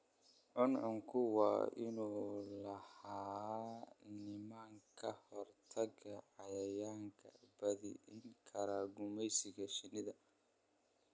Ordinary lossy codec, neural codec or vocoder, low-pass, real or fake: none; none; none; real